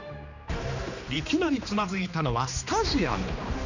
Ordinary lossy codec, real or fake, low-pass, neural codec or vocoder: none; fake; 7.2 kHz; codec, 16 kHz, 2 kbps, X-Codec, HuBERT features, trained on general audio